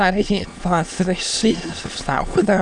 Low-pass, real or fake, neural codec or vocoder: 9.9 kHz; fake; autoencoder, 22.05 kHz, a latent of 192 numbers a frame, VITS, trained on many speakers